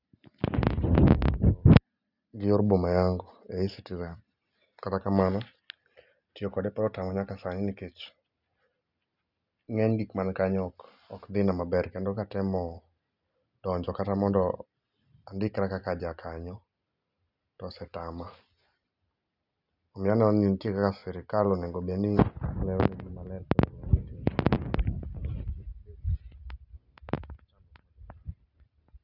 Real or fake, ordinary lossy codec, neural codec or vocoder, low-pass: real; none; none; 5.4 kHz